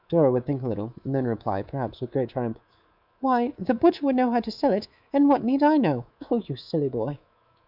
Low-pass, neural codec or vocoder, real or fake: 5.4 kHz; codec, 24 kHz, 3.1 kbps, DualCodec; fake